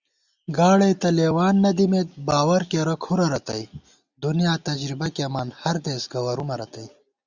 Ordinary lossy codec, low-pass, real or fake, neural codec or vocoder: Opus, 64 kbps; 7.2 kHz; real; none